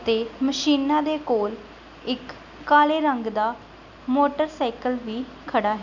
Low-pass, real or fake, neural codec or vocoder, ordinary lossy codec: 7.2 kHz; real; none; none